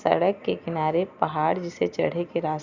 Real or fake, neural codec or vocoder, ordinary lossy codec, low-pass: real; none; Opus, 64 kbps; 7.2 kHz